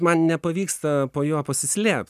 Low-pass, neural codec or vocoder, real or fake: 14.4 kHz; none; real